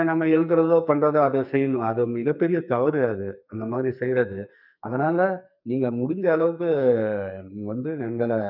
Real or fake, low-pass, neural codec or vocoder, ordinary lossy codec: fake; 5.4 kHz; codec, 44.1 kHz, 2.6 kbps, SNAC; none